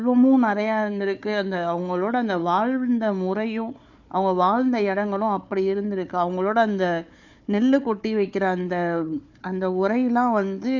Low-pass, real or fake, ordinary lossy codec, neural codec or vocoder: 7.2 kHz; fake; none; codec, 16 kHz, 4 kbps, FunCodec, trained on Chinese and English, 50 frames a second